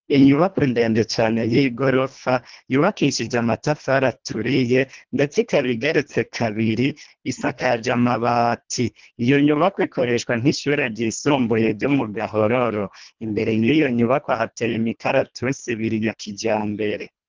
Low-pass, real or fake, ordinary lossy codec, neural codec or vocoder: 7.2 kHz; fake; Opus, 24 kbps; codec, 24 kHz, 1.5 kbps, HILCodec